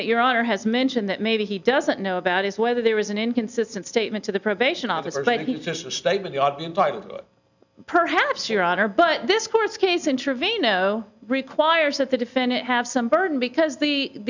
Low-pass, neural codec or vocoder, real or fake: 7.2 kHz; none; real